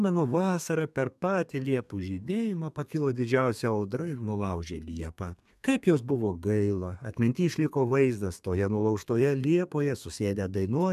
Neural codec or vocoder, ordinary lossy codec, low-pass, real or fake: codec, 32 kHz, 1.9 kbps, SNAC; MP3, 96 kbps; 14.4 kHz; fake